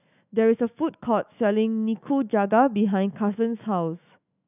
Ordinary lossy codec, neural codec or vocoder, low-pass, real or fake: none; autoencoder, 48 kHz, 128 numbers a frame, DAC-VAE, trained on Japanese speech; 3.6 kHz; fake